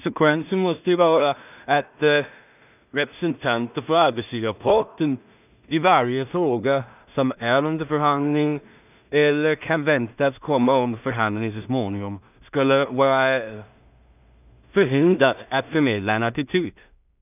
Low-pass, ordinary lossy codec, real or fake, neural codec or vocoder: 3.6 kHz; none; fake; codec, 16 kHz in and 24 kHz out, 0.4 kbps, LongCat-Audio-Codec, two codebook decoder